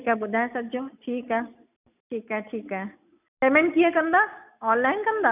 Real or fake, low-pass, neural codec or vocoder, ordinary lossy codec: real; 3.6 kHz; none; none